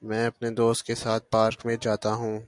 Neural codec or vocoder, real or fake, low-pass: none; real; 10.8 kHz